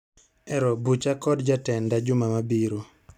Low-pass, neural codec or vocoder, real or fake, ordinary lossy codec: 19.8 kHz; none; real; none